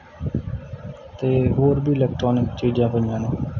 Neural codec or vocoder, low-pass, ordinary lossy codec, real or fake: none; 7.2 kHz; none; real